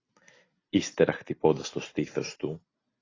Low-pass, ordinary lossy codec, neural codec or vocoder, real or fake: 7.2 kHz; AAC, 32 kbps; none; real